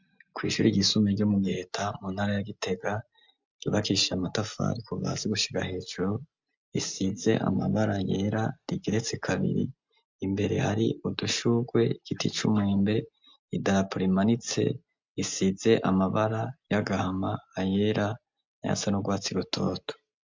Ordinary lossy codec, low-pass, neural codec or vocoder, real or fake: MP3, 64 kbps; 7.2 kHz; none; real